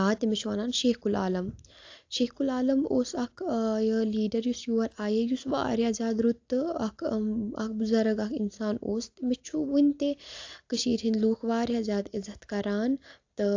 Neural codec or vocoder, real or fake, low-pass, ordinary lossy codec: none; real; 7.2 kHz; AAC, 48 kbps